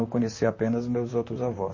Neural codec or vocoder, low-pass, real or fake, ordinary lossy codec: vocoder, 44.1 kHz, 128 mel bands, Pupu-Vocoder; 7.2 kHz; fake; MP3, 32 kbps